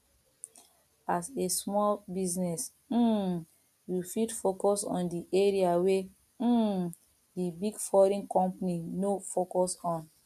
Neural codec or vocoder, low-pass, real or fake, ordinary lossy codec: none; 14.4 kHz; real; none